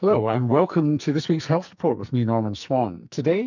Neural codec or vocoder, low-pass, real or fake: codec, 44.1 kHz, 2.6 kbps, SNAC; 7.2 kHz; fake